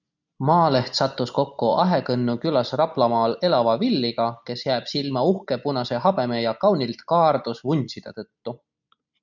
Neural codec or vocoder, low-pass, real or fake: none; 7.2 kHz; real